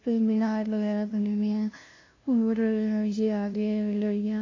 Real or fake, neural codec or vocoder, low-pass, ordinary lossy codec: fake; codec, 16 kHz, 0.5 kbps, FunCodec, trained on LibriTTS, 25 frames a second; 7.2 kHz; AAC, 32 kbps